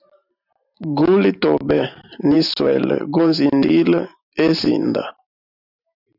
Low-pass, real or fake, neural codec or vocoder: 5.4 kHz; fake; vocoder, 44.1 kHz, 128 mel bands every 512 samples, BigVGAN v2